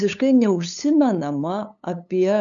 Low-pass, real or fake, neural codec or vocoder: 7.2 kHz; fake; codec, 16 kHz, 8 kbps, FunCodec, trained on LibriTTS, 25 frames a second